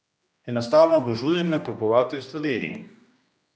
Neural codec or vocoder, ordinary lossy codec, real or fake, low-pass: codec, 16 kHz, 1 kbps, X-Codec, HuBERT features, trained on general audio; none; fake; none